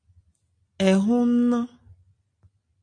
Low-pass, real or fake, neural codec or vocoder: 9.9 kHz; real; none